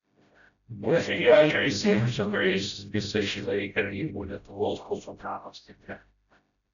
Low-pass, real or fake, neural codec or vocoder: 7.2 kHz; fake; codec, 16 kHz, 0.5 kbps, FreqCodec, smaller model